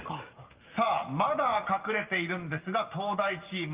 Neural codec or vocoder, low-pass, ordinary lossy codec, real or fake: none; 3.6 kHz; Opus, 32 kbps; real